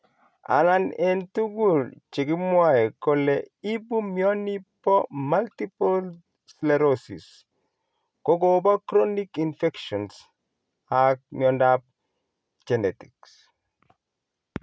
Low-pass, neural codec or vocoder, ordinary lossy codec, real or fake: none; none; none; real